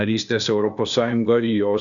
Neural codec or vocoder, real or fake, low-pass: codec, 16 kHz, 0.8 kbps, ZipCodec; fake; 7.2 kHz